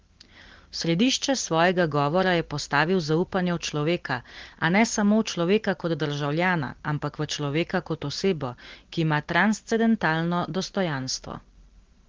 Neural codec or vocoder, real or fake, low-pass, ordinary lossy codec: none; real; 7.2 kHz; Opus, 16 kbps